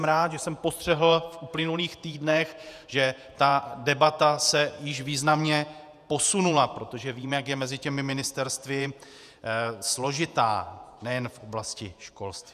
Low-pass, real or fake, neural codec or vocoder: 14.4 kHz; fake; vocoder, 48 kHz, 128 mel bands, Vocos